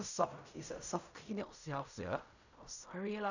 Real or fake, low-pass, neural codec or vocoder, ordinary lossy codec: fake; 7.2 kHz; codec, 16 kHz in and 24 kHz out, 0.4 kbps, LongCat-Audio-Codec, fine tuned four codebook decoder; none